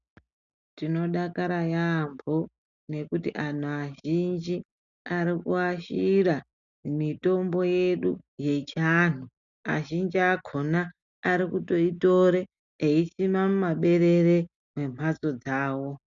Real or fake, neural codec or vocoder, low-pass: real; none; 7.2 kHz